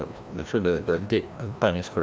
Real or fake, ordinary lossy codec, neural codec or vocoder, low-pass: fake; none; codec, 16 kHz, 1 kbps, FreqCodec, larger model; none